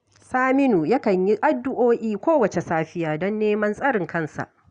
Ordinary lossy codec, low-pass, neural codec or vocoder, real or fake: Opus, 64 kbps; 9.9 kHz; none; real